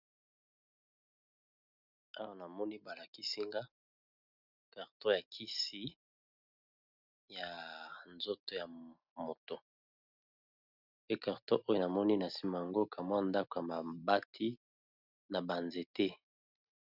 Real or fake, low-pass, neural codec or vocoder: real; 5.4 kHz; none